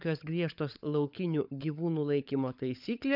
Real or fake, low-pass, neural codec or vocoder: fake; 5.4 kHz; codec, 16 kHz, 16 kbps, FunCodec, trained on LibriTTS, 50 frames a second